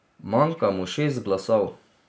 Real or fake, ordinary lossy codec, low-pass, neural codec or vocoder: real; none; none; none